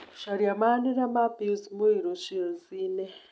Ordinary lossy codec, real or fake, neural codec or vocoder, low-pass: none; real; none; none